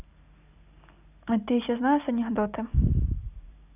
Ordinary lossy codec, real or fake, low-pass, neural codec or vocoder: none; real; 3.6 kHz; none